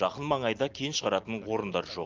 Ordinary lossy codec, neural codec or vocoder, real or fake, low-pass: Opus, 24 kbps; none; real; 7.2 kHz